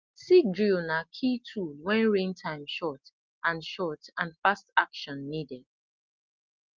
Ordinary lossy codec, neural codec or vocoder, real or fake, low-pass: Opus, 24 kbps; none; real; 7.2 kHz